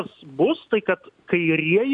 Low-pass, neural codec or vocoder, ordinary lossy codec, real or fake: 10.8 kHz; none; MP3, 64 kbps; real